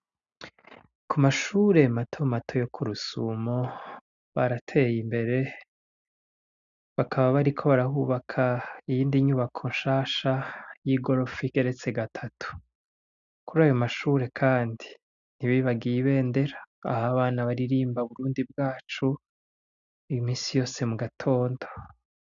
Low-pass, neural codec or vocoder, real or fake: 7.2 kHz; none; real